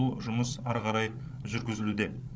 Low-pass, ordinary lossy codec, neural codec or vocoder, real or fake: none; none; codec, 16 kHz, 16 kbps, FreqCodec, larger model; fake